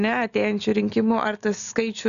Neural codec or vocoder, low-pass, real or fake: none; 7.2 kHz; real